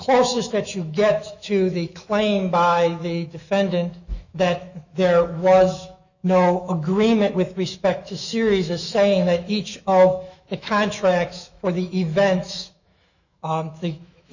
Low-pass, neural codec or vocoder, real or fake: 7.2 kHz; autoencoder, 48 kHz, 128 numbers a frame, DAC-VAE, trained on Japanese speech; fake